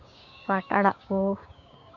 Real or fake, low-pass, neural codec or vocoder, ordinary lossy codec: fake; 7.2 kHz; codec, 16 kHz, 6 kbps, DAC; none